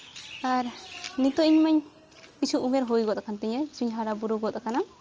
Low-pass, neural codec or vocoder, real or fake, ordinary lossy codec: 7.2 kHz; none; real; Opus, 24 kbps